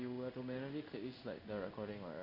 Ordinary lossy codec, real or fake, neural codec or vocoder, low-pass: none; fake; codec, 16 kHz in and 24 kHz out, 1 kbps, XY-Tokenizer; 5.4 kHz